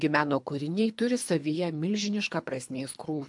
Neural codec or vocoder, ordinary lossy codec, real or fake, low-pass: codec, 24 kHz, 3 kbps, HILCodec; AAC, 64 kbps; fake; 10.8 kHz